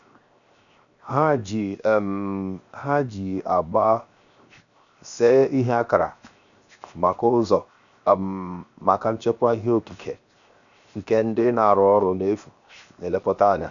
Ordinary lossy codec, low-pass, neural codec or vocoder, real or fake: none; 7.2 kHz; codec, 16 kHz, 0.7 kbps, FocalCodec; fake